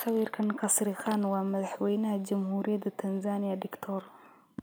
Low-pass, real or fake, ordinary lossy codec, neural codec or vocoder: none; real; none; none